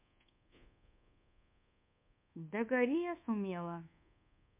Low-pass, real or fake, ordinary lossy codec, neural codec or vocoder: 3.6 kHz; fake; MP3, 32 kbps; codec, 24 kHz, 1.2 kbps, DualCodec